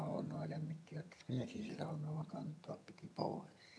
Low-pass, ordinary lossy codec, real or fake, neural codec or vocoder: none; none; fake; vocoder, 22.05 kHz, 80 mel bands, HiFi-GAN